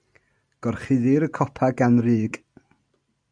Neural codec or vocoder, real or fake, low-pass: none; real; 9.9 kHz